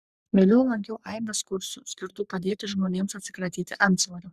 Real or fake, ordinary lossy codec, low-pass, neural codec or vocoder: fake; Opus, 64 kbps; 14.4 kHz; codec, 44.1 kHz, 3.4 kbps, Pupu-Codec